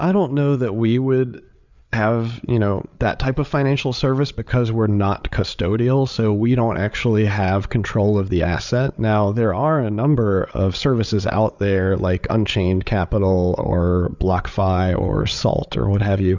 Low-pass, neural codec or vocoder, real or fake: 7.2 kHz; codec, 16 kHz, 8 kbps, FreqCodec, larger model; fake